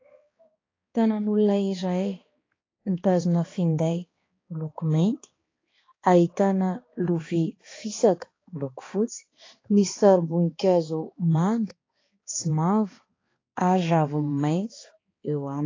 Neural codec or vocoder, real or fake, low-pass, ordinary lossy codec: codec, 16 kHz, 2 kbps, X-Codec, HuBERT features, trained on balanced general audio; fake; 7.2 kHz; AAC, 32 kbps